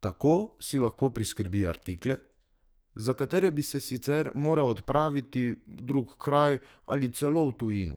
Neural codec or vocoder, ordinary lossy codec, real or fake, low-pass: codec, 44.1 kHz, 2.6 kbps, SNAC; none; fake; none